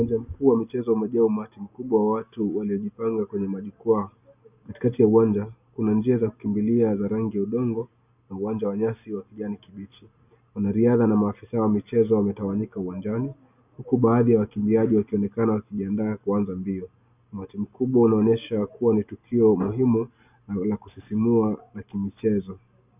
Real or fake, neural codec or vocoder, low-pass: real; none; 3.6 kHz